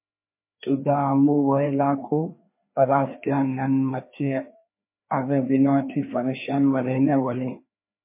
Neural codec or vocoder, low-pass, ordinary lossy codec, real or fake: codec, 16 kHz, 2 kbps, FreqCodec, larger model; 3.6 kHz; MP3, 24 kbps; fake